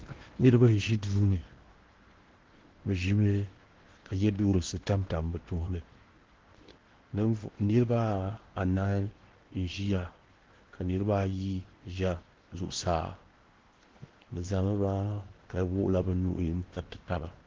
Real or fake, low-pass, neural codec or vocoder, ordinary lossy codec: fake; 7.2 kHz; codec, 16 kHz in and 24 kHz out, 0.8 kbps, FocalCodec, streaming, 65536 codes; Opus, 16 kbps